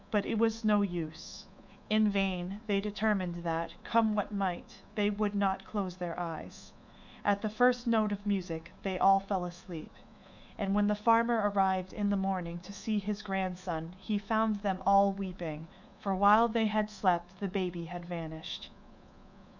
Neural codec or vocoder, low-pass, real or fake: codec, 24 kHz, 3.1 kbps, DualCodec; 7.2 kHz; fake